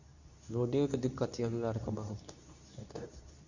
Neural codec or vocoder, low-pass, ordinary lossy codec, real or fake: codec, 24 kHz, 0.9 kbps, WavTokenizer, medium speech release version 2; 7.2 kHz; none; fake